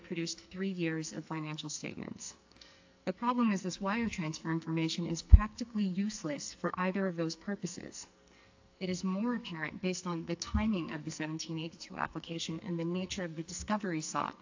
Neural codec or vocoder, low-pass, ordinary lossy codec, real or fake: codec, 44.1 kHz, 2.6 kbps, SNAC; 7.2 kHz; AAC, 48 kbps; fake